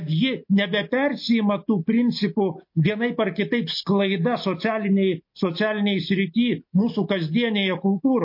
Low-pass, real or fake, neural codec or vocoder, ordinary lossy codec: 5.4 kHz; real; none; MP3, 32 kbps